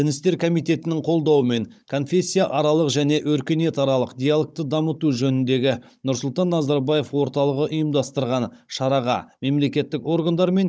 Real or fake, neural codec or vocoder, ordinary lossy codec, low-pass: fake; codec, 16 kHz, 16 kbps, FunCodec, trained on Chinese and English, 50 frames a second; none; none